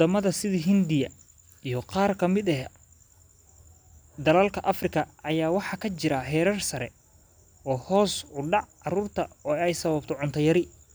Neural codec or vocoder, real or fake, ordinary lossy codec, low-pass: none; real; none; none